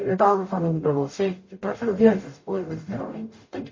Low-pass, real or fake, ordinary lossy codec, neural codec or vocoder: 7.2 kHz; fake; MP3, 32 kbps; codec, 44.1 kHz, 0.9 kbps, DAC